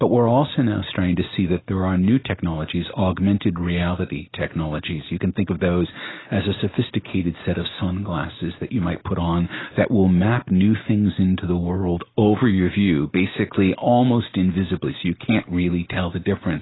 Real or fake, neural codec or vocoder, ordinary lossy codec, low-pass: real; none; AAC, 16 kbps; 7.2 kHz